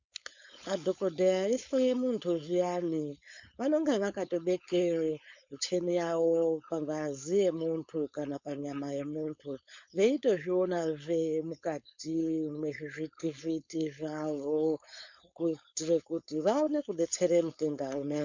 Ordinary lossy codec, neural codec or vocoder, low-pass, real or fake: MP3, 64 kbps; codec, 16 kHz, 4.8 kbps, FACodec; 7.2 kHz; fake